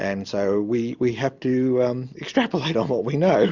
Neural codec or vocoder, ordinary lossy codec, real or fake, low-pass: none; Opus, 64 kbps; real; 7.2 kHz